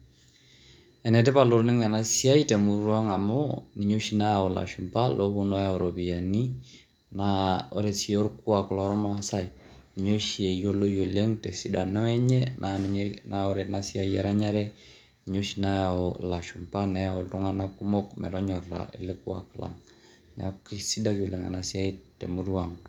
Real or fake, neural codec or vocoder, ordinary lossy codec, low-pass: fake; codec, 44.1 kHz, 7.8 kbps, DAC; none; 19.8 kHz